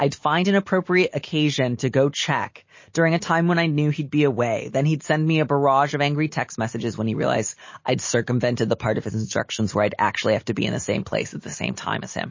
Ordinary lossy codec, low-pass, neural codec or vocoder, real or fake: MP3, 32 kbps; 7.2 kHz; none; real